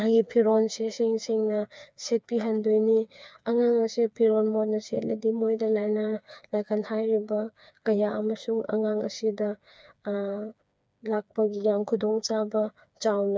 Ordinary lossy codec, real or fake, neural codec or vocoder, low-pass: none; fake; codec, 16 kHz, 4 kbps, FreqCodec, smaller model; none